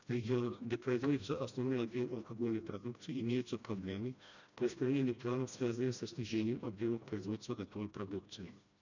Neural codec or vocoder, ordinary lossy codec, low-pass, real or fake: codec, 16 kHz, 1 kbps, FreqCodec, smaller model; none; 7.2 kHz; fake